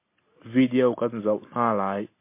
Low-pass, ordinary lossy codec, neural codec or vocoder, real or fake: 3.6 kHz; MP3, 24 kbps; none; real